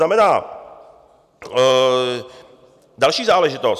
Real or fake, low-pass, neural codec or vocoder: real; 14.4 kHz; none